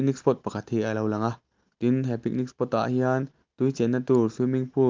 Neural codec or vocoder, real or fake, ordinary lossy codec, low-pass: none; real; Opus, 32 kbps; 7.2 kHz